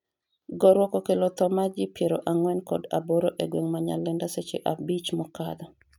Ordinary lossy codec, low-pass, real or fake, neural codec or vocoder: none; 19.8 kHz; real; none